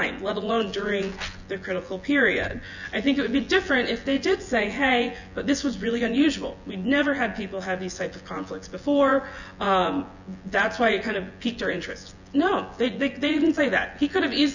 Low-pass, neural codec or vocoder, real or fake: 7.2 kHz; vocoder, 24 kHz, 100 mel bands, Vocos; fake